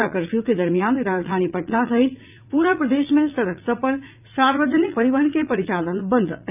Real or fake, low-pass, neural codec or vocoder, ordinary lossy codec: fake; 3.6 kHz; vocoder, 44.1 kHz, 80 mel bands, Vocos; none